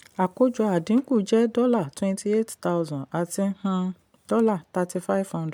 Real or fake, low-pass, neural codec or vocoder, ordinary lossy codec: real; 19.8 kHz; none; MP3, 96 kbps